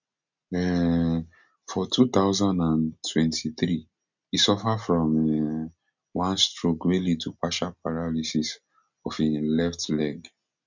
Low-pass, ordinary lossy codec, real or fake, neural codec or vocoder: 7.2 kHz; none; real; none